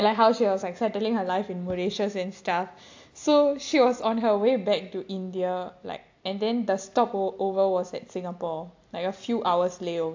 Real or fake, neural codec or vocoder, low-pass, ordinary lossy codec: real; none; 7.2 kHz; AAC, 48 kbps